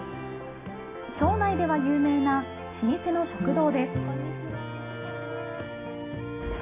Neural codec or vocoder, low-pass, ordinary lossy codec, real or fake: none; 3.6 kHz; AAC, 16 kbps; real